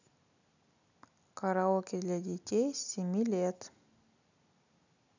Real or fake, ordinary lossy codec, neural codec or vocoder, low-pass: real; none; none; 7.2 kHz